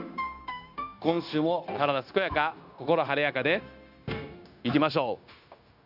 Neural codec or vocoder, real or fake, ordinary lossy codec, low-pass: codec, 16 kHz, 0.9 kbps, LongCat-Audio-Codec; fake; none; 5.4 kHz